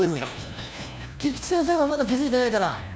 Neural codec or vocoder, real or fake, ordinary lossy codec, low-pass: codec, 16 kHz, 1 kbps, FunCodec, trained on LibriTTS, 50 frames a second; fake; none; none